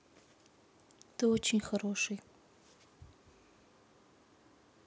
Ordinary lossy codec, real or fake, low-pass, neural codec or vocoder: none; real; none; none